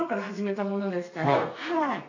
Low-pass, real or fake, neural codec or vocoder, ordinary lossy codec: 7.2 kHz; fake; codec, 32 kHz, 1.9 kbps, SNAC; AAC, 48 kbps